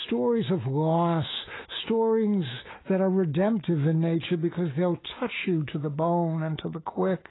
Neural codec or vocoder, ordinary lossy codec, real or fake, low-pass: autoencoder, 48 kHz, 128 numbers a frame, DAC-VAE, trained on Japanese speech; AAC, 16 kbps; fake; 7.2 kHz